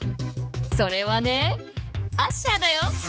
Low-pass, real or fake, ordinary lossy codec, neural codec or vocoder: none; fake; none; codec, 16 kHz, 4 kbps, X-Codec, HuBERT features, trained on general audio